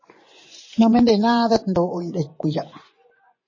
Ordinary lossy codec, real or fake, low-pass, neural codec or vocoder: MP3, 32 kbps; fake; 7.2 kHz; vocoder, 24 kHz, 100 mel bands, Vocos